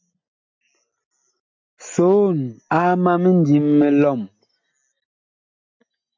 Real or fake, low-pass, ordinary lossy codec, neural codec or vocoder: real; 7.2 kHz; MP3, 48 kbps; none